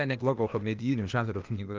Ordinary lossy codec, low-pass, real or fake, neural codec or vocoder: Opus, 24 kbps; 7.2 kHz; fake; codec, 16 kHz, 0.8 kbps, ZipCodec